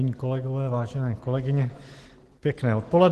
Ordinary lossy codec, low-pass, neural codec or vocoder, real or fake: Opus, 16 kbps; 14.4 kHz; none; real